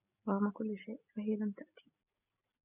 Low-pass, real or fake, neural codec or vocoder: 3.6 kHz; real; none